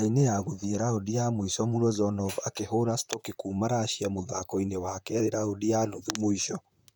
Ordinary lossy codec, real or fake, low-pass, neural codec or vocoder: none; fake; none; vocoder, 44.1 kHz, 128 mel bands, Pupu-Vocoder